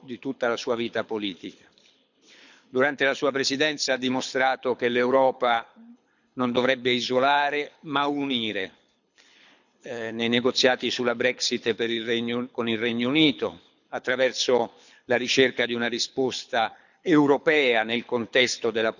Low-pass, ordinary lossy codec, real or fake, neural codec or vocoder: 7.2 kHz; none; fake; codec, 24 kHz, 6 kbps, HILCodec